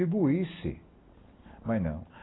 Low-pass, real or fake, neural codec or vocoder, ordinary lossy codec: 7.2 kHz; real; none; AAC, 16 kbps